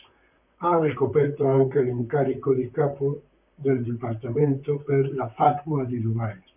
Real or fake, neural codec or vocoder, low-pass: fake; vocoder, 44.1 kHz, 128 mel bands, Pupu-Vocoder; 3.6 kHz